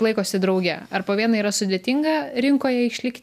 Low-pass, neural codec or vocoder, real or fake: 14.4 kHz; none; real